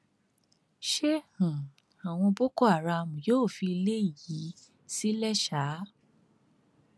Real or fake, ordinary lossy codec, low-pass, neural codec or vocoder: real; none; none; none